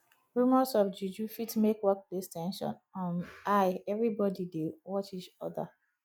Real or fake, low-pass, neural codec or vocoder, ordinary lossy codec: real; none; none; none